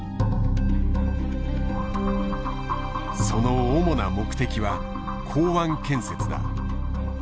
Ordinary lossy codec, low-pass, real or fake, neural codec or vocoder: none; none; real; none